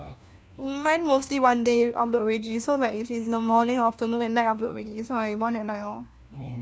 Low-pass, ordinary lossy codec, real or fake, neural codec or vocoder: none; none; fake; codec, 16 kHz, 1 kbps, FunCodec, trained on LibriTTS, 50 frames a second